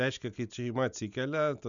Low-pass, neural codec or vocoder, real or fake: 7.2 kHz; none; real